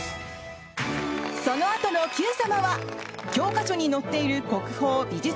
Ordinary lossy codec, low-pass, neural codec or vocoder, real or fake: none; none; none; real